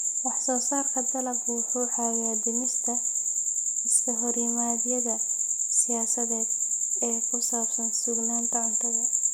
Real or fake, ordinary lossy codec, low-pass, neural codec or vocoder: real; none; none; none